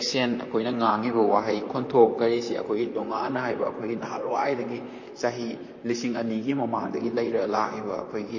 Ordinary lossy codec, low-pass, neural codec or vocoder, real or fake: MP3, 32 kbps; 7.2 kHz; vocoder, 44.1 kHz, 128 mel bands, Pupu-Vocoder; fake